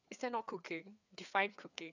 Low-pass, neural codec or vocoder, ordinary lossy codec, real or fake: 7.2 kHz; codec, 16 kHz, 4 kbps, FreqCodec, larger model; none; fake